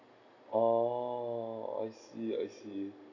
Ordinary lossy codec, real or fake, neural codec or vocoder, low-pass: none; real; none; 7.2 kHz